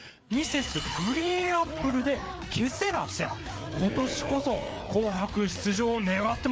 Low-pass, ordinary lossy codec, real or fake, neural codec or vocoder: none; none; fake; codec, 16 kHz, 4 kbps, FreqCodec, larger model